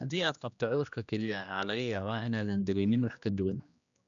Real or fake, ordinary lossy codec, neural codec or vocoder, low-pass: fake; none; codec, 16 kHz, 1 kbps, X-Codec, HuBERT features, trained on general audio; 7.2 kHz